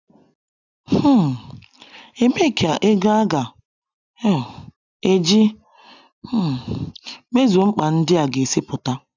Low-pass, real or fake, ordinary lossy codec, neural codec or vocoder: 7.2 kHz; real; none; none